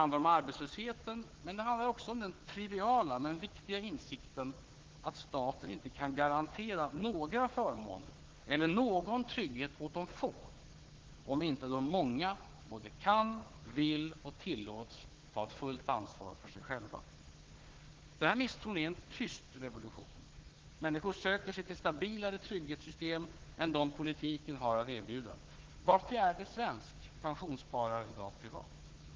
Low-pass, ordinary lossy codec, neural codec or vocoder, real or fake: 7.2 kHz; Opus, 16 kbps; codec, 16 kHz, 4 kbps, FunCodec, trained on Chinese and English, 50 frames a second; fake